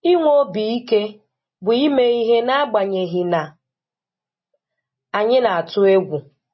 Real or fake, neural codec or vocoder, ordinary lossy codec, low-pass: real; none; MP3, 24 kbps; 7.2 kHz